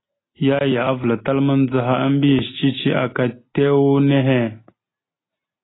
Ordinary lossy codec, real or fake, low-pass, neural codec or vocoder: AAC, 16 kbps; real; 7.2 kHz; none